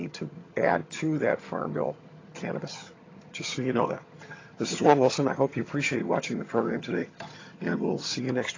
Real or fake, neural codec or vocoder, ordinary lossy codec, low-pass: fake; vocoder, 22.05 kHz, 80 mel bands, HiFi-GAN; AAC, 32 kbps; 7.2 kHz